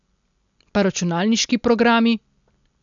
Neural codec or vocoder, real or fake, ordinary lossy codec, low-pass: none; real; none; 7.2 kHz